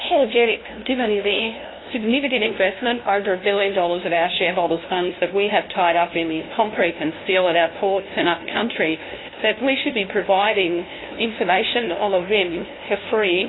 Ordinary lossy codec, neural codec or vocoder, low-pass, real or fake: AAC, 16 kbps; codec, 16 kHz, 0.5 kbps, FunCodec, trained on LibriTTS, 25 frames a second; 7.2 kHz; fake